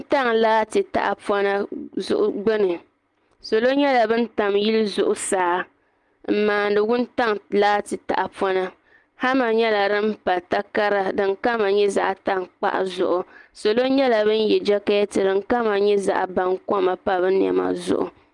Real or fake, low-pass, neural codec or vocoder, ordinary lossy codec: real; 10.8 kHz; none; Opus, 32 kbps